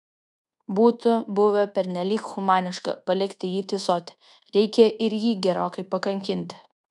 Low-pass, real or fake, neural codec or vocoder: 10.8 kHz; fake; codec, 24 kHz, 1.2 kbps, DualCodec